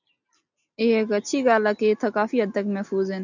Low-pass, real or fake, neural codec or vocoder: 7.2 kHz; real; none